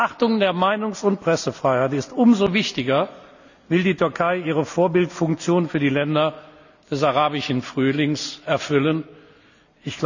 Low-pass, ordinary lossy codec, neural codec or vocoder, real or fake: 7.2 kHz; none; none; real